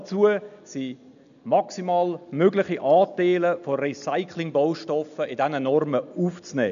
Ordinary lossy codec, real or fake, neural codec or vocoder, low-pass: none; real; none; 7.2 kHz